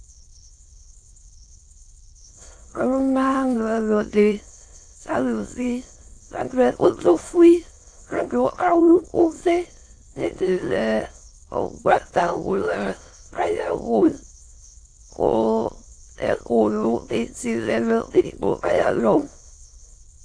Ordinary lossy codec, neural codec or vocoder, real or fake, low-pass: AAC, 48 kbps; autoencoder, 22.05 kHz, a latent of 192 numbers a frame, VITS, trained on many speakers; fake; 9.9 kHz